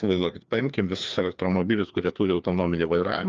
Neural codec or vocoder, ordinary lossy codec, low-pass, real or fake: codec, 16 kHz, 2 kbps, FreqCodec, larger model; Opus, 32 kbps; 7.2 kHz; fake